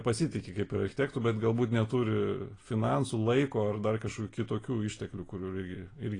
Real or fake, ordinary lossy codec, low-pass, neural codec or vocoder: real; AAC, 32 kbps; 9.9 kHz; none